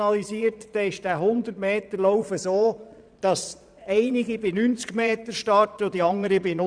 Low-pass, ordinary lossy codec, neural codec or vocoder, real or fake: 9.9 kHz; none; vocoder, 22.05 kHz, 80 mel bands, Vocos; fake